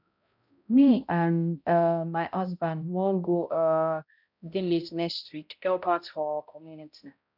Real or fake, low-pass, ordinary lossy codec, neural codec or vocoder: fake; 5.4 kHz; none; codec, 16 kHz, 0.5 kbps, X-Codec, HuBERT features, trained on balanced general audio